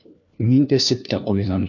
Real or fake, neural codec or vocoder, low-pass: fake; codec, 16 kHz, 1 kbps, FunCodec, trained on LibriTTS, 50 frames a second; 7.2 kHz